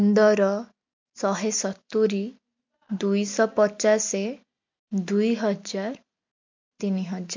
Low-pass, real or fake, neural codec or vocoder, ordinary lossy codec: 7.2 kHz; real; none; MP3, 48 kbps